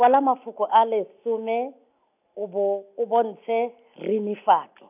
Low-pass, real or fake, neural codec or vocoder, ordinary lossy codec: 3.6 kHz; real; none; none